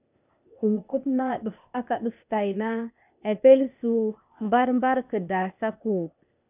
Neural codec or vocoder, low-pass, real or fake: codec, 16 kHz, 0.8 kbps, ZipCodec; 3.6 kHz; fake